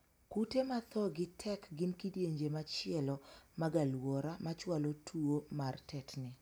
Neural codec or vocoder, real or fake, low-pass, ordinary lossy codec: none; real; none; none